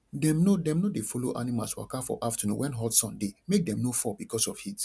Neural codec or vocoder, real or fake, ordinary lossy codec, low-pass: none; real; none; none